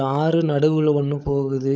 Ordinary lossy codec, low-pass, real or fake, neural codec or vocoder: none; none; fake; codec, 16 kHz, 16 kbps, FunCodec, trained on LibriTTS, 50 frames a second